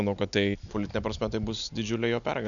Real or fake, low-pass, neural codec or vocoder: real; 7.2 kHz; none